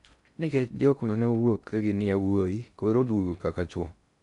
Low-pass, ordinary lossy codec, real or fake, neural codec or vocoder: 10.8 kHz; none; fake; codec, 16 kHz in and 24 kHz out, 0.6 kbps, FocalCodec, streaming, 4096 codes